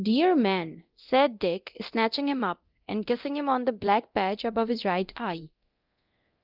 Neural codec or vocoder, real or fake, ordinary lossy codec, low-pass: codec, 24 kHz, 0.9 kbps, DualCodec; fake; Opus, 24 kbps; 5.4 kHz